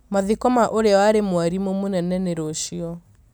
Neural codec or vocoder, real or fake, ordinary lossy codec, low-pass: none; real; none; none